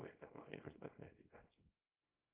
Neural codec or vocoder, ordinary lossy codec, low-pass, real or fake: codec, 24 kHz, 0.9 kbps, WavTokenizer, small release; Opus, 24 kbps; 3.6 kHz; fake